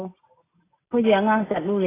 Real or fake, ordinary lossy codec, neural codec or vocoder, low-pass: real; AAC, 16 kbps; none; 3.6 kHz